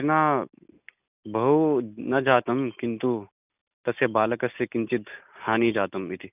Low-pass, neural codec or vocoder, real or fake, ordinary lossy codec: 3.6 kHz; none; real; none